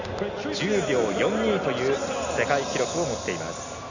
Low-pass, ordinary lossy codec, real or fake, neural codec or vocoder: 7.2 kHz; none; real; none